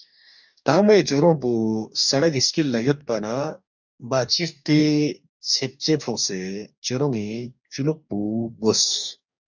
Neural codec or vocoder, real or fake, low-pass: codec, 44.1 kHz, 2.6 kbps, DAC; fake; 7.2 kHz